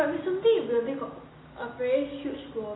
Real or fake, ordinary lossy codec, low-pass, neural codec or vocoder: real; AAC, 16 kbps; 7.2 kHz; none